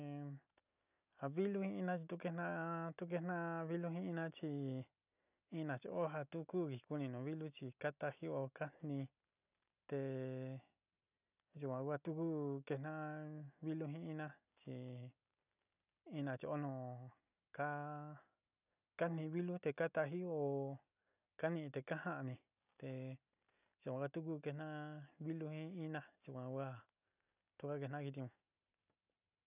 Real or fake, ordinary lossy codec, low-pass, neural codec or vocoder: real; none; 3.6 kHz; none